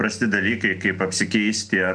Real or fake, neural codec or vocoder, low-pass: real; none; 9.9 kHz